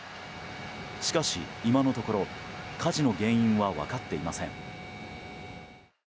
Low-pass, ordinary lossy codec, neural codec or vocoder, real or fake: none; none; none; real